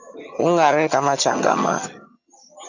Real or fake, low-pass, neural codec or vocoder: fake; 7.2 kHz; vocoder, 22.05 kHz, 80 mel bands, HiFi-GAN